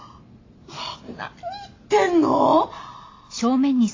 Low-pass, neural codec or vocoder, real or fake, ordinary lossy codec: 7.2 kHz; none; real; AAC, 32 kbps